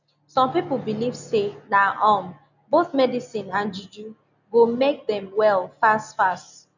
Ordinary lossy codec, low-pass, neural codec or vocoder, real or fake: none; 7.2 kHz; none; real